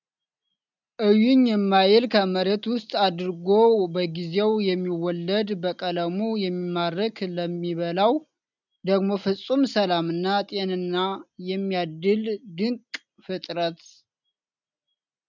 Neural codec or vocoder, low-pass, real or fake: none; 7.2 kHz; real